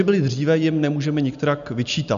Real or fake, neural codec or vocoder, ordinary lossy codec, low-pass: real; none; AAC, 96 kbps; 7.2 kHz